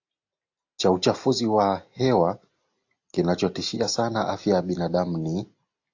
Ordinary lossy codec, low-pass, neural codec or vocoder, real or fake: AAC, 48 kbps; 7.2 kHz; none; real